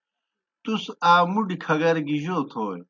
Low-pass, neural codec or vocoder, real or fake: 7.2 kHz; none; real